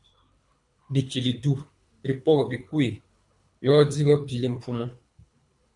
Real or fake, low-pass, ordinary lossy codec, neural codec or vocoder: fake; 10.8 kHz; MP3, 64 kbps; codec, 24 kHz, 3 kbps, HILCodec